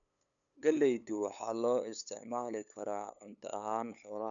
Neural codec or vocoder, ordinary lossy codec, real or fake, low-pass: codec, 16 kHz, 8 kbps, FunCodec, trained on LibriTTS, 25 frames a second; none; fake; 7.2 kHz